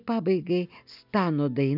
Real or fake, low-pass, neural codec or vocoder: real; 5.4 kHz; none